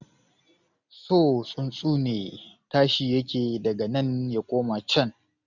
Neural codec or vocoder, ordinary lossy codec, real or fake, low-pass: none; none; real; 7.2 kHz